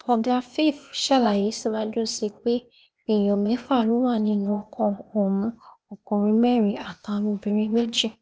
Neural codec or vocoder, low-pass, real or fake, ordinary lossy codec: codec, 16 kHz, 0.8 kbps, ZipCodec; none; fake; none